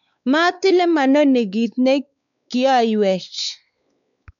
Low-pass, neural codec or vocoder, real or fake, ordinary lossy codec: 7.2 kHz; codec, 16 kHz, 4 kbps, X-Codec, WavLM features, trained on Multilingual LibriSpeech; fake; none